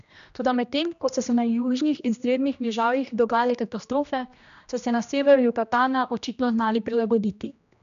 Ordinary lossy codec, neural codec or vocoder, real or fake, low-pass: none; codec, 16 kHz, 1 kbps, X-Codec, HuBERT features, trained on general audio; fake; 7.2 kHz